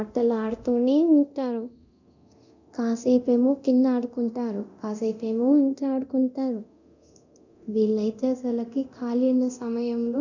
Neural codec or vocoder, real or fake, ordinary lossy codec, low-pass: codec, 24 kHz, 0.9 kbps, DualCodec; fake; none; 7.2 kHz